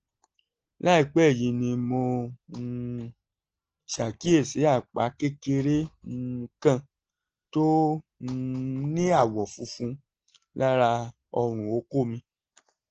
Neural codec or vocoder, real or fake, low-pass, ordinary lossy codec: none; real; 7.2 kHz; Opus, 16 kbps